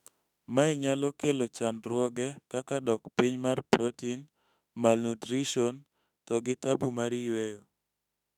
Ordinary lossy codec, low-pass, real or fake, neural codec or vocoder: none; 19.8 kHz; fake; autoencoder, 48 kHz, 32 numbers a frame, DAC-VAE, trained on Japanese speech